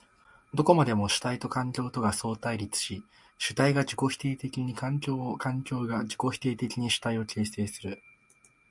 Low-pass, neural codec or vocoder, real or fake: 10.8 kHz; none; real